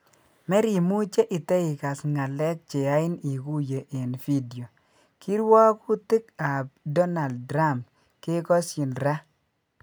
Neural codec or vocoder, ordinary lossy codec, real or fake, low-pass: none; none; real; none